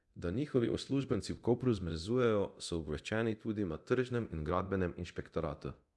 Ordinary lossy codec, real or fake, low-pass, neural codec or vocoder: none; fake; none; codec, 24 kHz, 0.9 kbps, DualCodec